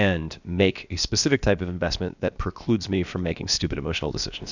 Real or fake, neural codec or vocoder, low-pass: fake; codec, 16 kHz, about 1 kbps, DyCAST, with the encoder's durations; 7.2 kHz